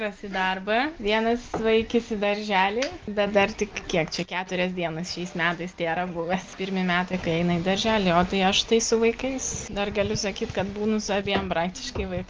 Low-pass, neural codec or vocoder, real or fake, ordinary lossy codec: 7.2 kHz; none; real; Opus, 32 kbps